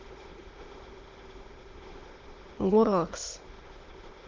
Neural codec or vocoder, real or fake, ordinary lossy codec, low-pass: autoencoder, 22.05 kHz, a latent of 192 numbers a frame, VITS, trained on many speakers; fake; Opus, 16 kbps; 7.2 kHz